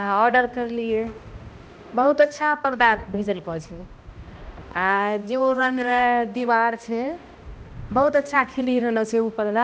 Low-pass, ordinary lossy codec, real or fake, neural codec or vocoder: none; none; fake; codec, 16 kHz, 1 kbps, X-Codec, HuBERT features, trained on balanced general audio